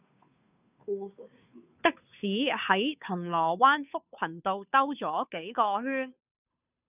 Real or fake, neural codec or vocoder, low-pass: fake; codec, 16 kHz, 2 kbps, FunCodec, trained on Chinese and English, 25 frames a second; 3.6 kHz